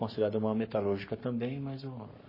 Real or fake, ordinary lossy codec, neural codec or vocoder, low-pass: fake; MP3, 24 kbps; codec, 44.1 kHz, 7.8 kbps, Pupu-Codec; 5.4 kHz